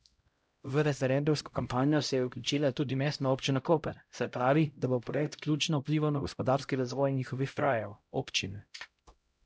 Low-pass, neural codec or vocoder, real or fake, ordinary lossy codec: none; codec, 16 kHz, 0.5 kbps, X-Codec, HuBERT features, trained on LibriSpeech; fake; none